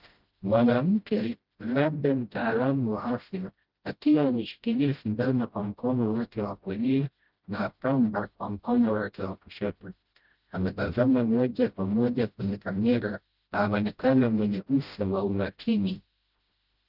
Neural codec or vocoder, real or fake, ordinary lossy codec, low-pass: codec, 16 kHz, 0.5 kbps, FreqCodec, smaller model; fake; Opus, 32 kbps; 5.4 kHz